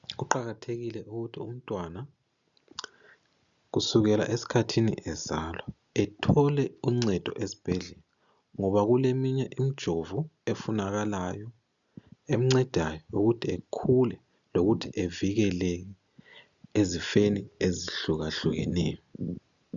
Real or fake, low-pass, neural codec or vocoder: real; 7.2 kHz; none